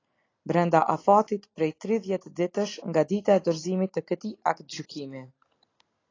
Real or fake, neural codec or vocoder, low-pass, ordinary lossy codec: real; none; 7.2 kHz; AAC, 32 kbps